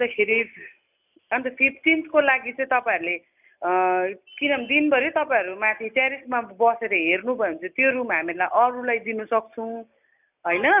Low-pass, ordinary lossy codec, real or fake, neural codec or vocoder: 3.6 kHz; none; real; none